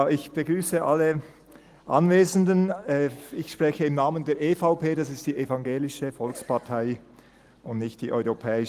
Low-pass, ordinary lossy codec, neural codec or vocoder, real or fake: 14.4 kHz; Opus, 24 kbps; none; real